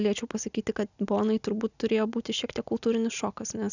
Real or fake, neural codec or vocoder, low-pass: real; none; 7.2 kHz